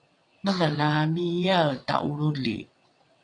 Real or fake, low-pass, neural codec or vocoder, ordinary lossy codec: fake; 9.9 kHz; vocoder, 22.05 kHz, 80 mel bands, WaveNeXt; AAC, 48 kbps